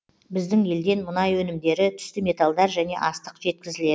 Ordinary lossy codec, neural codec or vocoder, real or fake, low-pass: none; none; real; none